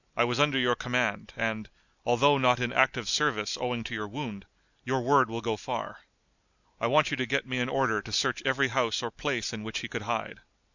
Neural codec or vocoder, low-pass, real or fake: none; 7.2 kHz; real